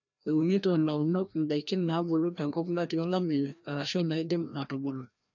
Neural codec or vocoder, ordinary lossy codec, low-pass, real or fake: codec, 16 kHz, 1 kbps, FreqCodec, larger model; none; 7.2 kHz; fake